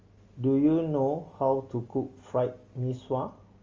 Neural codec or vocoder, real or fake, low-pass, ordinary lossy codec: none; real; 7.2 kHz; Opus, 32 kbps